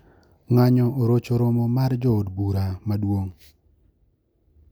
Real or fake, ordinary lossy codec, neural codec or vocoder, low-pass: real; none; none; none